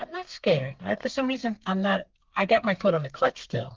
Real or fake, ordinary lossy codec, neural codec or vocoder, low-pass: fake; Opus, 24 kbps; codec, 32 kHz, 1.9 kbps, SNAC; 7.2 kHz